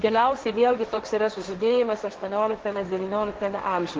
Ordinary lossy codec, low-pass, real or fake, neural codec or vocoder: Opus, 16 kbps; 7.2 kHz; fake; codec, 16 kHz, 1.1 kbps, Voila-Tokenizer